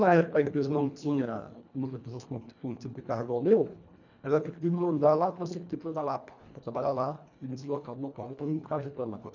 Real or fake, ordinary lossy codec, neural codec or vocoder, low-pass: fake; none; codec, 24 kHz, 1.5 kbps, HILCodec; 7.2 kHz